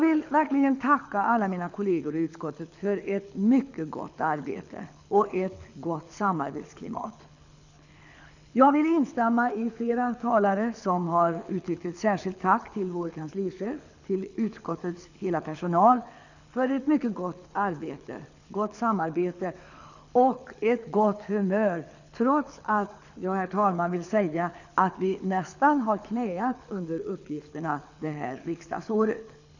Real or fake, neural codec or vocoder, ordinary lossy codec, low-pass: fake; codec, 24 kHz, 6 kbps, HILCodec; none; 7.2 kHz